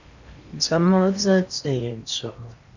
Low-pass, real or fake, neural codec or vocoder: 7.2 kHz; fake; codec, 16 kHz in and 24 kHz out, 0.8 kbps, FocalCodec, streaming, 65536 codes